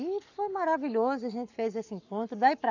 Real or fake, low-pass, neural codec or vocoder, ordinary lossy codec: fake; 7.2 kHz; codec, 44.1 kHz, 7.8 kbps, Pupu-Codec; none